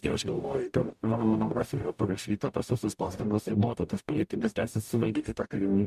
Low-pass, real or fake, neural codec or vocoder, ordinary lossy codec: 14.4 kHz; fake; codec, 44.1 kHz, 0.9 kbps, DAC; MP3, 96 kbps